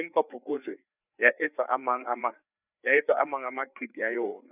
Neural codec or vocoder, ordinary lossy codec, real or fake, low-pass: codec, 16 kHz, 4 kbps, FreqCodec, larger model; none; fake; 3.6 kHz